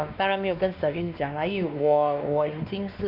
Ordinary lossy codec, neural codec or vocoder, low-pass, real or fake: none; codec, 16 kHz, 2 kbps, X-Codec, WavLM features, trained on Multilingual LibriSpeech; 5.4 kHz; fake